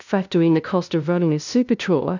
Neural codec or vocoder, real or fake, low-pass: codec, 16 kHz, 0.5 kbps, FunCodec, trained on LibriTTS, 25 frames a second; fake; 7.2 kHz